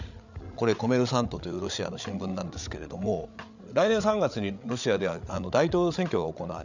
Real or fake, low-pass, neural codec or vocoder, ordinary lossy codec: fake; 7.2 kHz; codec, 16 kHz, 8 kbps, FreqCodec, larger model; none